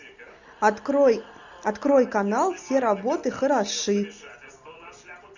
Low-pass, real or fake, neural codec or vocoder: 7.2 kHz; real; none